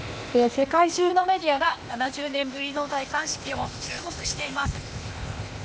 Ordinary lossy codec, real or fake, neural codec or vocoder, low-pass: none; fake; codec, 16 kHz, 0.8 kbps, ZipCodec; none